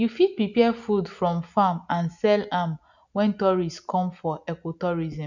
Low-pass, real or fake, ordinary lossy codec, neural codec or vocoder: 7.2 kHz; real; none; none